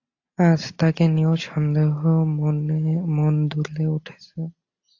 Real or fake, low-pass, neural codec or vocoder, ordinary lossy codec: real; 7.2 kHz; none; AAC, 48 kbps